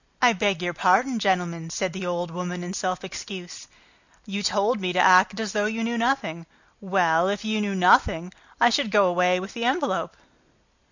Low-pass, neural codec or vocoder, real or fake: 7.2 kHz; none; real